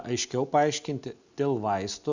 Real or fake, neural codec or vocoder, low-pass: real; none; 7.2 kHz